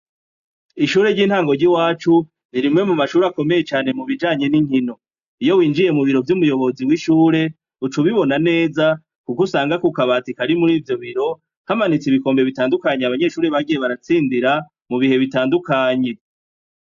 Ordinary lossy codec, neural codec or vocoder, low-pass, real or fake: AAC, 96 kbps; none; 7.2 kHz; real